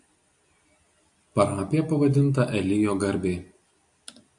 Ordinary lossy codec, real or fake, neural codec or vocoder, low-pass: AAC, 64 kbps; real; none; 10.8 kHz